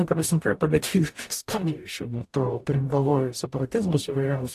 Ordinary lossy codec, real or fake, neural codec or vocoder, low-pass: AAC, 64 kbps; fake; codec, 44.1 kHz, 0.9 kbps, DAC; 14.4 kHz